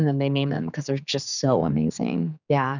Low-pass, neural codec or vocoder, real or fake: 7.2 kHz; codec, 16 kHz, 4 kbps, X-Codec, HuBERT features, trained on general audio; fake